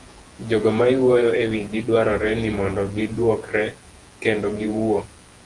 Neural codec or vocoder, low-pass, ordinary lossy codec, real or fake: vocoder, 48 kHz, 128 mel bands, Vocos; 10.8 kHz; Opus, 24 kbps; fake